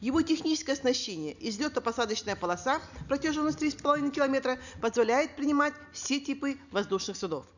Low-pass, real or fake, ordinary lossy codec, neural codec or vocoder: 7.2 kHz; real; none; none